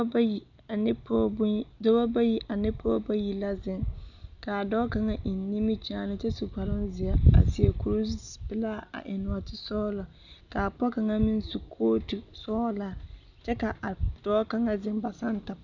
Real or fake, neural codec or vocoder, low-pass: real; none; 7.2 kHz